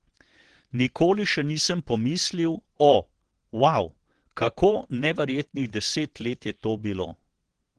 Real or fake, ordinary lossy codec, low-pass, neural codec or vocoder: fake; Opus, 16 kbps; 9.9 kHz; vocoder, 22.05 kHz, 80 mel bands, WaveNeXt